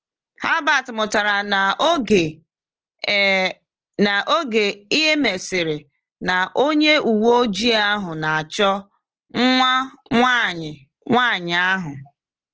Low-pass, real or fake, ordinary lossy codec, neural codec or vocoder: 7.2 kHz; real; Opus, 16 kbps; none